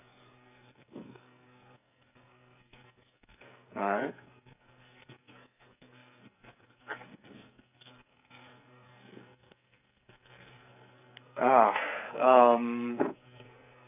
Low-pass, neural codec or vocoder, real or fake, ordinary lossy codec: 3.6 kHz; codec, 44.1 kHz, 2.6 kbps, SNAC; fake; none